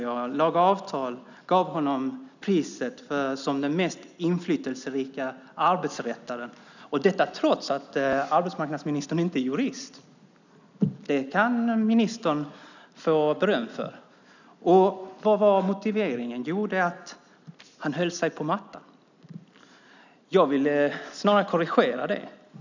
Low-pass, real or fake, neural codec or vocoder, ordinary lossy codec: 7.2 kHz; real; none; none